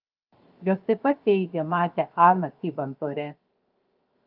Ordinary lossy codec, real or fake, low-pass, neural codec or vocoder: Opus, 24 kbps; fake; 5.4 kHz; codec, 16 kHz, 0.7 kbps, FocalCodec